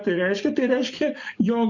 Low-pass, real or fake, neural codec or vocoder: 7.2 kHz; fake; codec, 44.1 kHz, 7.8 kbps, DAC